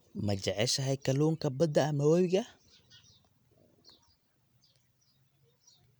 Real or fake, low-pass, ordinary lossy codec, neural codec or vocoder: real; none; none; none